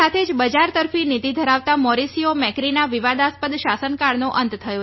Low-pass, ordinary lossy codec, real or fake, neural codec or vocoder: 7.2 kHz; MP3, 24 kbps; real; none